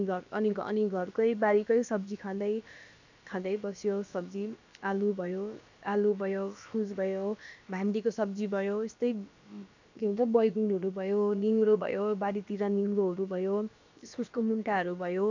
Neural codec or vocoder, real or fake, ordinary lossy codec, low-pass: codec, 16 kHz, about 1 kbps, DyCAST, with the encoder's durations; fake; AAC, 48 kbps; 7.2 kHz